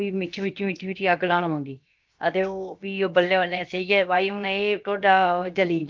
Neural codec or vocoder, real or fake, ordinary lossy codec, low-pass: codec, 16 kHz, about 1 kbps, DyCAST, with the encoder's durations; fake; Opus, 32 kbps; 7.2 kHz